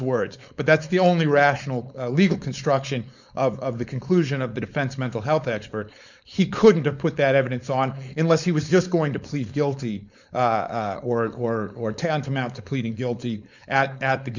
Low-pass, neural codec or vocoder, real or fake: 7.2 kHz; codec, 16 kHz, 4.8 kbps, FACodec; fake